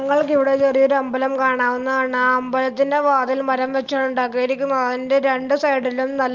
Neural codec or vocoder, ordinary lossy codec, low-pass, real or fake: none; Opus, 24 kbps; 7.2 kHz; real